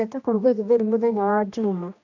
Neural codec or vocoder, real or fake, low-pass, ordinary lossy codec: codec, 16 kHz, 1 kbps, X-Codec, HuBERT features, trained on general audio; fake; 7.2 kHz; AAC, 48 kbps